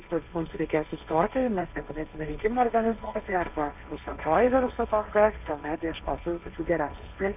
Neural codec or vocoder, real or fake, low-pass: codec, 16 kHz, 1.1 kbps, Voila-Tokenizer; fake; 3.6 kHz